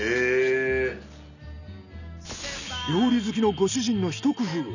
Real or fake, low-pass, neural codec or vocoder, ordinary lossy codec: real; 7.2 kHz; none; none